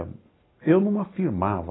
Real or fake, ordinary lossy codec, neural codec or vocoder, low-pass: real; AAC, 16 kbps; none; 7.2 kHz